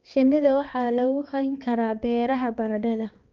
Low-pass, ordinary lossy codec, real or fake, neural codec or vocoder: 7.2 kHz; Opus, 32 kbps; fake; codec, 16 kHz, 2 kbps, X-Codec, HuBERT features, trained on balanced general audio